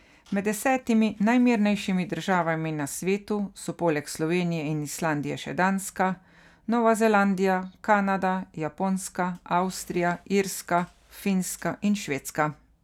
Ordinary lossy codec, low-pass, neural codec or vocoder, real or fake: none; 19.8 kHz; none; real